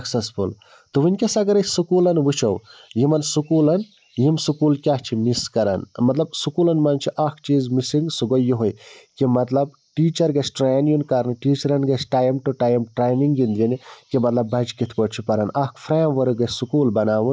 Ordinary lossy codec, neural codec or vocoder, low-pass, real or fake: none; none; none; real